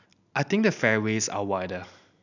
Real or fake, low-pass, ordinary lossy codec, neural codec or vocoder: real; 7.2 kHz; none; none